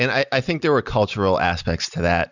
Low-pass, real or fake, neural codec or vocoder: 7.2 kHz; real; none